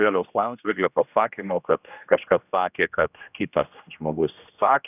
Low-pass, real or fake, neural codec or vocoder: 3.6 kHz; fake; codec, 16 kHz, 1 kbps, X-Codec, HuBERT features, trained on general audio